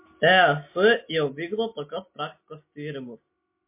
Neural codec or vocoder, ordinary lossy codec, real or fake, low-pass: none; MP3, 24 kbps; real; 3.6 kHz